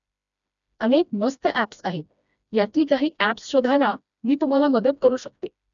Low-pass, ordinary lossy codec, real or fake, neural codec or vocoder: 7.2 kHz; none; fake; codec, 16 kHz, 1 kbps, FreqCodec, smaller model